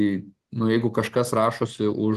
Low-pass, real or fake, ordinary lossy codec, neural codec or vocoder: 14.4 kHz; real; Opus, 16 kbps; none